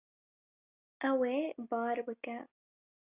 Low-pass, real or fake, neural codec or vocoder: 3.6 kHz; real; none